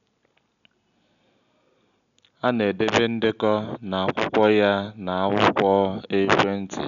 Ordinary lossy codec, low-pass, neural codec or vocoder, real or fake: none; 7.2 kHz; none; real